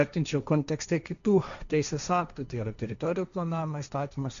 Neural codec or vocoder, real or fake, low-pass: codec, 16 kHz, 1.1 kbps, Voila-Tokenizer; fake; 7.2 kHz